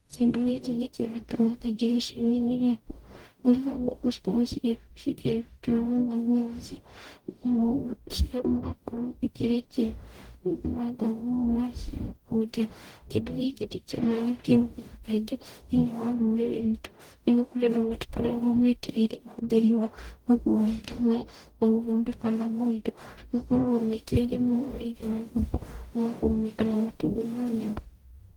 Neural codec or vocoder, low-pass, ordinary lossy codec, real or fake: codec, 44.1 kHz, 0.9 kbps, DAC; 19.8 kHz; Opus, 24 kbps; fake